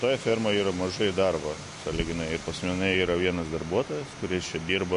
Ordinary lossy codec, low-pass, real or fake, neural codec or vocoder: MP3, 48 kbps; 14.4 kHz; real; none